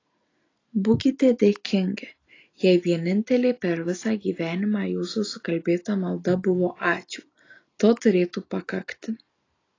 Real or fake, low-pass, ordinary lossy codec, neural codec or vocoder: real; 7.2 kHz; AAC, 32 kbps; none